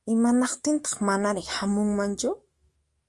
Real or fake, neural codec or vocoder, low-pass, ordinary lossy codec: fake; autoencoder, 48 kHz, 128 numbers a frame, DAC-VAE, trained on Japanese speech; 10.8 kHz; Opus, 32 kbps